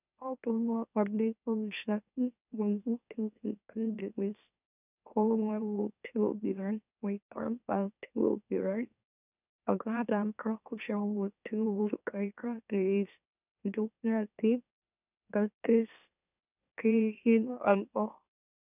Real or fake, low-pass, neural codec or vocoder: fake; 3.6 kHz; autoencoder, 44.1 kHz, a latent of 192 numbers a frame, MeloTTS